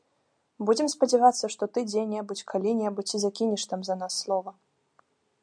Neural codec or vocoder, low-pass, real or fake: none; 9.9 kHz; real